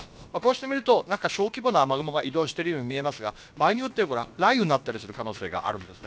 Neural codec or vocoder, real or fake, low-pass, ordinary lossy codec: codec, 16 kHz, about 1 kbps, DyCAST, with the encoder's durations; fake; none; none